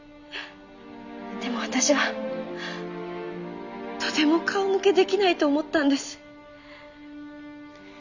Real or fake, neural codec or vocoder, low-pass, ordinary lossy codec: real; none; 7.2 kHz; none